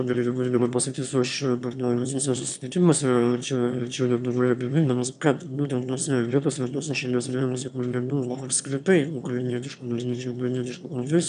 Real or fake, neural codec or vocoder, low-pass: fake; autoencoder, 22.05 kHz, a latent of 192 numbers a frame, VITS, trained on one speaker; 9.9 kHz